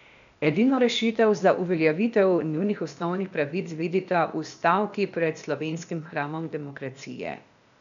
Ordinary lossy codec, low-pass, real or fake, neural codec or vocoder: none; 7.2 kHz; fake; codec, 16 kHz, 0.8 kbps, ZipCodec